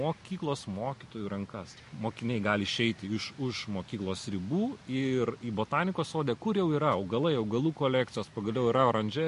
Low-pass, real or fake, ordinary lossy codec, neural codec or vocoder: 10.8 kHz; real; MP3, 48 kbps; none